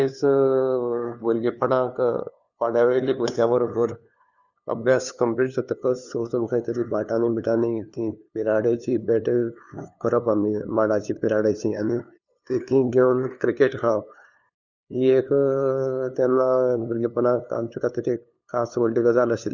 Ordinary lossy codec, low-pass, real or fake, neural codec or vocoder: none; 7.2 kHz; fake; codec, 16 kHz, 2 kbps, FunCodec, trained on LibriTTS, 25 frames a second